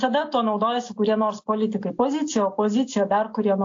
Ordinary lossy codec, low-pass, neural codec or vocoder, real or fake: AAC, 48 kbps; 7.2 kHz; none; real